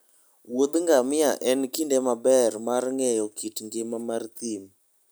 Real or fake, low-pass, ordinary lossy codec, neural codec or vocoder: real; none; none; none